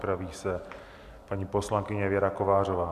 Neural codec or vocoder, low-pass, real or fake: none; 14.4 kHz; real